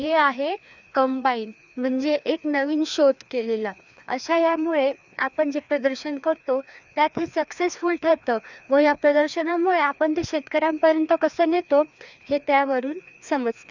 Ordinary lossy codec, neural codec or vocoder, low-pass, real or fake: none; codec, 16 kHz, 2 kbps, FreqCodec, larger model; 7.2 kHz; fake